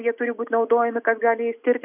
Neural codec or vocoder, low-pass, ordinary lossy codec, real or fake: none; 3.6 kHz; AAC, 32 kbps; real